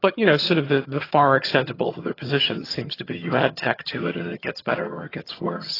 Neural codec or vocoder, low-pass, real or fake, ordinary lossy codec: vocoder, 22.05 kHz, 80 mel bands, HiFi-GAN; 5.4 kHz; fake; AAC, 24 kbps